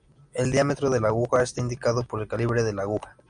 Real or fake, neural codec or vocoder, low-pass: real; none; 9.9 kHz